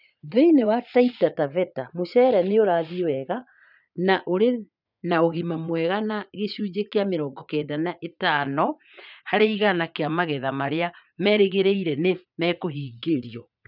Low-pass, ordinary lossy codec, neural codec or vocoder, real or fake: 5.4 kHz; none; vocoder, 44.1 kHz, 80 mel bands, Vocos; fake